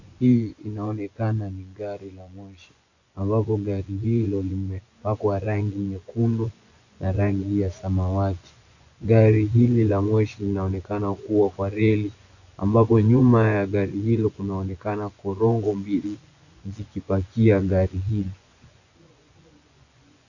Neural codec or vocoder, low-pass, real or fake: vocoder, 24 kHz, 100 mel bands, Vocos; 7.2 kHz; fake